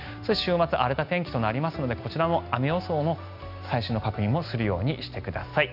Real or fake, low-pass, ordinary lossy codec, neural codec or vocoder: real; 5.4 kHz; none; none